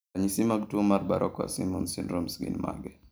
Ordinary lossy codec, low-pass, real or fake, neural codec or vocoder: none; none; real; none